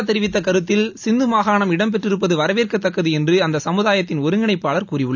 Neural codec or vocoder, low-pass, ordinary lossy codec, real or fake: none; 7.2 kHz; none; real